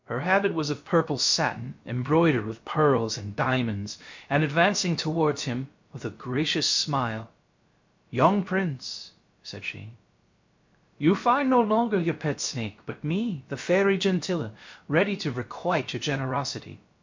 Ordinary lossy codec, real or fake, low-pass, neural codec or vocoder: MP3, 48 kbps; fake; 7.2 kHz; codec, 16 kHz, about 1 kbps, DyCAST, with the encoder's durations